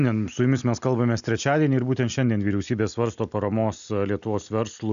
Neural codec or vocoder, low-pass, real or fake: none; 7.2 kHz; real